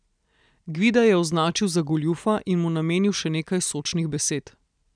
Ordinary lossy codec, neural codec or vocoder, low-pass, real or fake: none; none; 9.9 kHz; real